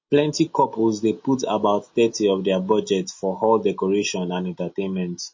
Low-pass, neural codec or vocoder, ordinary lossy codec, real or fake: 7.2 kHz; none; MP3, 32 kbps; real